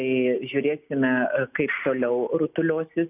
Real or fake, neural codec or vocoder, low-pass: real; none; 3.6 kHz